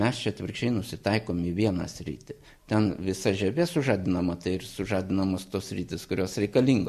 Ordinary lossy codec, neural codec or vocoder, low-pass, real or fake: MP3, 64 kbps; none; 14.4 kHz; real